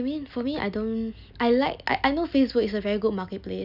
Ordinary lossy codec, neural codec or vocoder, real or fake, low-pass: none; none; real; 5.4 kHz